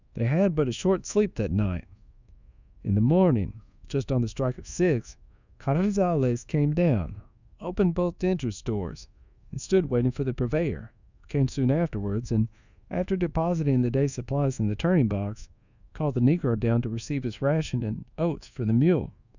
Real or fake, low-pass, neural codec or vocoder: fake; 7.2 kHz; codec, 24 kHz, 1.2 kbps, DualCodec